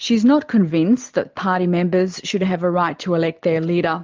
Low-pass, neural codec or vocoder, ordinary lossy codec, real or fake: 7.2 kHz; none; Opus, 24 kbps; real